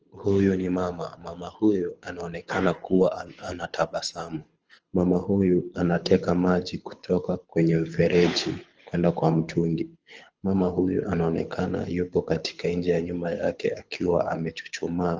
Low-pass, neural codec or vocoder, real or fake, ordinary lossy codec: 7.2 kHz; codec, 24 kHz, 6 kbps, HILCodec; fake; Opus, 24 kbps